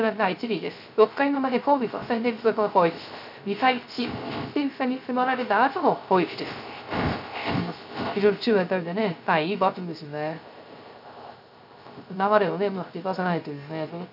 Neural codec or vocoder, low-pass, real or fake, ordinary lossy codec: codec, 16 kHz, 0.3 kbps, FocalCodec; 5.4 kHz; fake; none